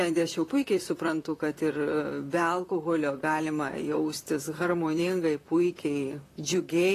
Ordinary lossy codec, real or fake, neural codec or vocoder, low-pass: AAC, 48 kbps; fake; vocoder, 44.1 kHz, 128 mel bands, Pupu-Vocoder; 14.4 kHz